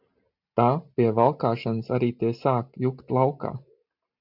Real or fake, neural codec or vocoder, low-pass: real; none; 5.4 kHz